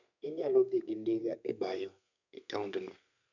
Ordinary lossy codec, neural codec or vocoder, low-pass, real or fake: none; codec, 32 kHz, 1.9 kbps, SNAC; 7.2 kHz; fake